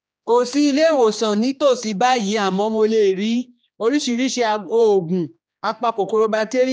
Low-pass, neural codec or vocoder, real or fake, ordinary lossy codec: none; codec, 16 kHz, 2 kbps, X-Codec, HuBERT features, trained on general audio; fake; none